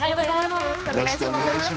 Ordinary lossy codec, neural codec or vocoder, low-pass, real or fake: none; codec, 16 kHz, 2 kbps, X-Codec, HuBERT features, trained on general audio; none; fake